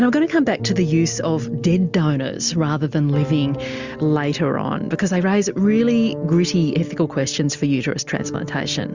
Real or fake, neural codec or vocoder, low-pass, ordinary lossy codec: real; none; 7.2 kHz; Opus, 64 kbps